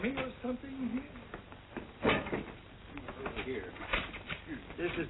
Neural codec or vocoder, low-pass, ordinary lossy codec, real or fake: none; 7.2 kHz; AAC, 16 kbps; real